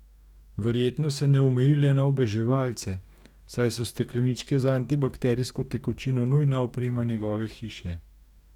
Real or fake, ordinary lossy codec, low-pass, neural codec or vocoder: fake; none; 19.8 kHz; codec, 44.1 kHz, 2.6 kbps, DAC